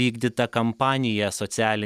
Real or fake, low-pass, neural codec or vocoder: real; 14.4 kHz; none